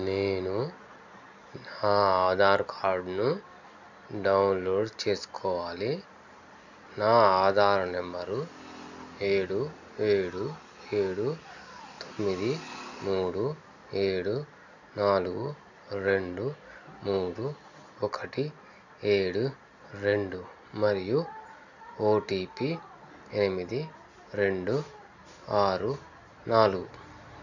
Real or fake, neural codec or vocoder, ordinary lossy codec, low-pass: real; none; none; 7.2 kHz